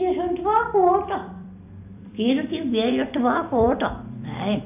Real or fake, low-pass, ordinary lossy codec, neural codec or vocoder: fake; 3.6 kHz; AAC, 32 kbps; vocoder, 44.1 kHz, 128 mel bands every 256 samples, BigVGAN v2